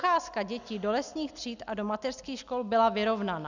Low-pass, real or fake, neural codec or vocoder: 7.2 kHz; real; none